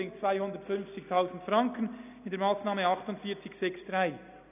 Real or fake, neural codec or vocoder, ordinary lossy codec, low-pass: real; none; none; 3.6 kHz